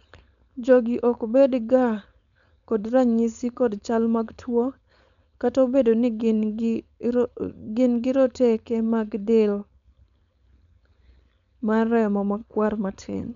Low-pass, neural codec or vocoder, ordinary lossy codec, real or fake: 7.2 kHz; codec, 16 kHz, 4.8 kbps, FACodec; none; fake